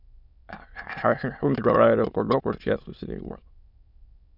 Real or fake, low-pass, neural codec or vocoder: fake; 5.4 kHz; autoencoder, 22.05 kHz, a latent of 192 numbers a frame, VITS, trained on many speakers